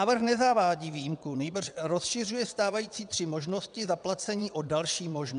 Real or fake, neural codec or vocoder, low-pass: fake; vocoder, 22.05 kHz, 80 mel bands, WaveNeXt; 9.9 kHz